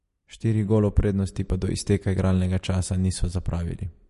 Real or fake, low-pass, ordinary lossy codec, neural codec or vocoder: real; 14.4 kHz; MP3, 48 kbps; none